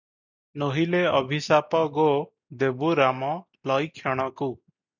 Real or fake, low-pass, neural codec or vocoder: real; 7.2 kHz; none